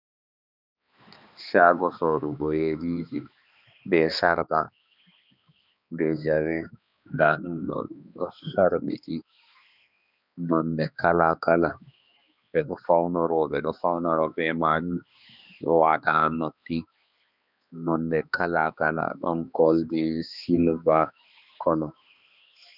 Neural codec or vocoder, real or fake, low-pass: codec, 16 kHz, 2 kbps, X-Codec, HuBERT features, trained on balanced general audio; fake; 5.4 kHz